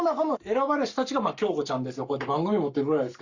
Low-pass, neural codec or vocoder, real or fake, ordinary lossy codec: 7.2 kHz; codec, 44.1 kHz, 7.8 kbps, Pupu-Codec; fake; Opus, 64 kbps